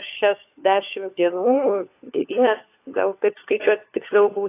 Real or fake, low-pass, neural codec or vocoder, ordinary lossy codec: fake; 3.6 kHz; codec, 16 kHz, 4 kbps, FunCodec, trained on LibriTTS, 50 frames a second; AAC, 24 kbps